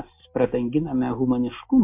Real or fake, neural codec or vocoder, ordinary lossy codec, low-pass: real; none; MP3, 32 kbps; 3.6 kHz